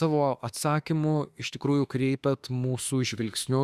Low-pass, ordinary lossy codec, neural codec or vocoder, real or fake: 14.4 kHz; Opus, 64 kbps; autoencoder, 48 kHz, 32 numbers a frame, DAC-VAE, trained on Japanese speech; fake